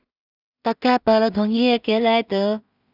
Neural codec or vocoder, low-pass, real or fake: codec, 16 kHz in and 24 kHz out, 0.4 kbps, LongCat-Audio-Codec, two codebook decoder; 5.4 kHz; fake